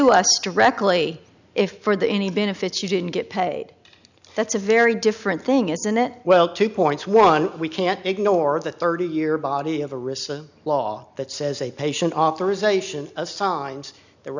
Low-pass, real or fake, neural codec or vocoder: 7.2 kHz; real; none